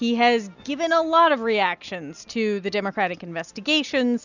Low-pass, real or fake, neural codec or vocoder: 7.2 kHz; real; none